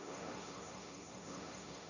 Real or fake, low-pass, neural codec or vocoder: fake; 7.2 kHz; codec, 16 kHz, 1.1 kbps, Voila-Tokenizer